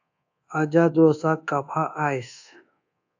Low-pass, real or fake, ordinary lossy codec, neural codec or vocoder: 7.2 kHz; fake; AAC, 48 kbps; codec, 24 kHz, 1.2 kbps, DualCodec